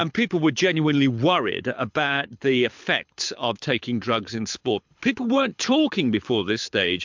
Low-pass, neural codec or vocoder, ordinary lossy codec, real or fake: 7.2 kHz; codec, 24 kHz, 6 kbps, HILCodec; MP3, 64 kbps; fake